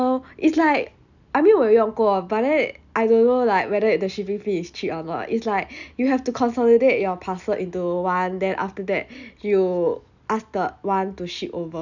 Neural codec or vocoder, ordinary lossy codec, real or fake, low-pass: none; none; real; 7.2 kHz